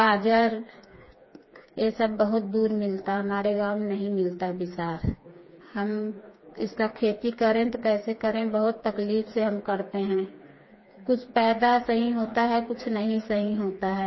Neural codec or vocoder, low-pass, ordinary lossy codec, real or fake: codec, 16 kHz, 4 kbps, FreqCodec, smaller model; 7.2 kHz; MP3, 24 kbps; fake